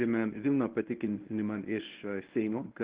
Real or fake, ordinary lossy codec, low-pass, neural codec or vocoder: fake; Opus, 16 kbps; 3.6 kHz; codec, 24 kHz, 0.9 kbps, WavTokenizer, medium speech release version 1